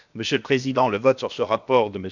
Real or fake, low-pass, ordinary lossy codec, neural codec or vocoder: fake; 7.2 kHz; none; codec, 16 kHz, about 1 kbps, DyCAST, with the encoder's durations